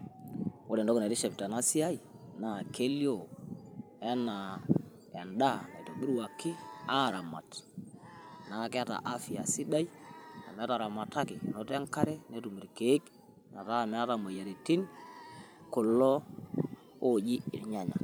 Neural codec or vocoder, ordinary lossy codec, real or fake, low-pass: vocoder, 44.1 kHz, 128 mel bands every 512 samples, BigVGAN v2; none; fake; none